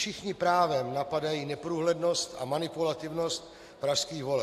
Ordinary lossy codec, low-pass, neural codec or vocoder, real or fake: AAC, 64 kbps; 14.4 kHz; none; real